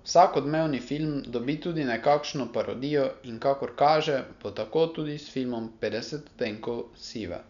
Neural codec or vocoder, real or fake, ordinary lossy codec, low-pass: none; real; none; 7.2 kHz